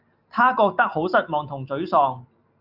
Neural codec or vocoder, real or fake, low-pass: none; real; 5.4 kHz